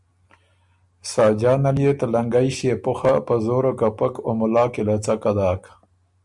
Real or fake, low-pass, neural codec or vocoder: real; 10.8 kHz; none